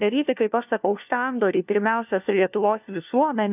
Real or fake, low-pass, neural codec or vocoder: fake; 3.6 kHz; codec, 16 kHz, 1 kbps, FunCodec, trained on LibriTTS, 50 frames a second